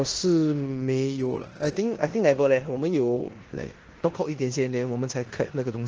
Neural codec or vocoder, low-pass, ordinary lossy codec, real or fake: codec, 16 kHz in and 24 kHz out, 0.9 kbps, LongCat-Audio-Codec, four codebook decoder; 7.2 kHz; Opus, 16 kbps; fake